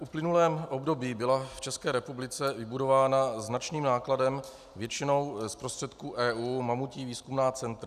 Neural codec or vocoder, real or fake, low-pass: none; real; 14.4 kHz